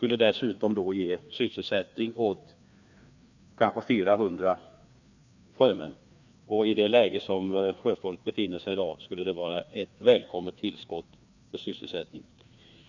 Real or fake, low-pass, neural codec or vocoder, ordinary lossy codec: fake; 7.2 kHz; codec, 16 kHz, 2 kbps, FreqCodec, larger model; Opus, 64 kbps